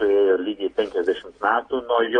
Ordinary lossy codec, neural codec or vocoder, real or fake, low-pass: AAC, 32 kbps; none; real; 9.9 kHz